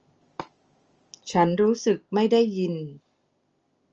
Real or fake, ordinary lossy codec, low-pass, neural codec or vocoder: real; Opus, 24 kbps; 7.2 kHz; none